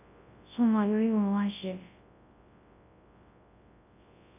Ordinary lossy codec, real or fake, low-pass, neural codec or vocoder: none; fake; 3.6 kHz; codec, 24 kHz, 0.9 kbps, WavTokenizer, large speech release